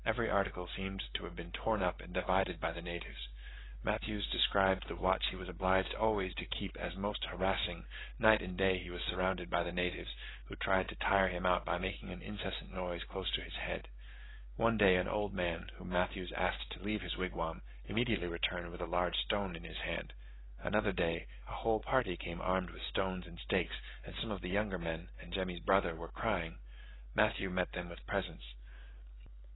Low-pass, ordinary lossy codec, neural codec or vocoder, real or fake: 7.2 kHz; AAC, 16 kbps; none; real